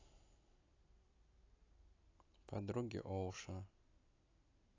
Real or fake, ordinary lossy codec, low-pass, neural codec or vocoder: real; AAC, 32 kbps; 7.2 kHz; none